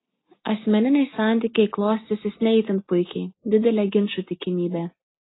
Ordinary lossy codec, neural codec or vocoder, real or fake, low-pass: AAC, 16 kbps; none; real; 7.2 kHz